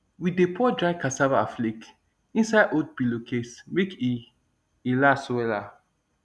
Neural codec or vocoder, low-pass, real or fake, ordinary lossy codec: none; none; real; none